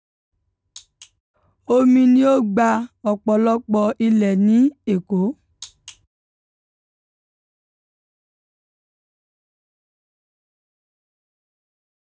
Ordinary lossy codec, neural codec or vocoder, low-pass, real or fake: none; none; none; real